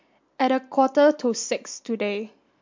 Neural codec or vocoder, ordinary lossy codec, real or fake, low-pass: none; MP3, 48 kbps; real; 7.2 kHz